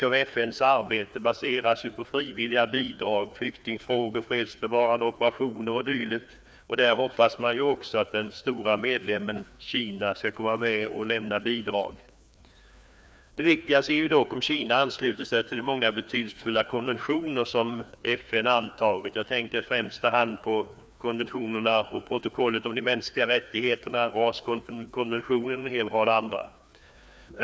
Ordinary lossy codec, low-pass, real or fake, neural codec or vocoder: none; none; fake; codec, 16 kHz, 2 kbps, FreqCodec, larger model